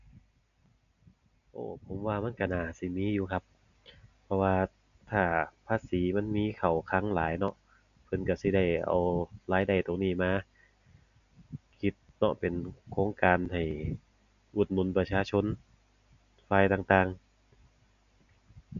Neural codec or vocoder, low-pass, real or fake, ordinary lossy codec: none; 7.2 kHz; real; AAC, 96 kbps